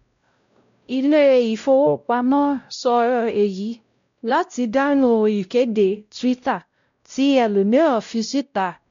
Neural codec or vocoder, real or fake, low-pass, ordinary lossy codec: codec, 16 kHz, 0.5 kbps, X-Codec, WavLM features, trained on Multilingual LibriSpeech; fake; 7.2 kHz; MP3, 64 kbps